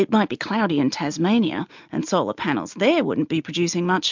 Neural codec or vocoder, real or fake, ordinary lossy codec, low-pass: none; real; MP3, 64 kbps; 7.2 kHz